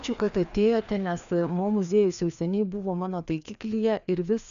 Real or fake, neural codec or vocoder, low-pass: fake; codec, 16 kHz, 2 kbps, FreqCodec, larger model; 7.2 kHz